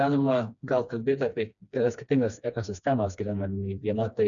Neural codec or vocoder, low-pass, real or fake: codec, 16 kHz, 2 kbps, FreqCodec, smaller model; 7.2 kHz; fake